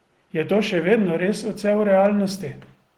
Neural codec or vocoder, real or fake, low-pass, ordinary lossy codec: none; real; 19.8 kHz; Opus, 16 kbps